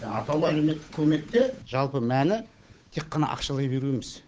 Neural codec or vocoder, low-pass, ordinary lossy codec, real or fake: codec, 16 kHz, 8 kbps, FunCodec, trained on Chinese and English, 25 frames a second; none; none; fake